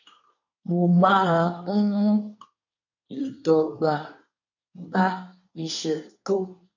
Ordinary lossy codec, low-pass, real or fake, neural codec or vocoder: none; 7.2 kHz; fake; codec, 24 kHz, 1 kbps, SNAC